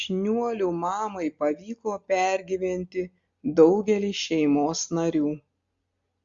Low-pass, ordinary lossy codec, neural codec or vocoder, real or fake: 7.2 kHz; Opus, 64 kbps; none; real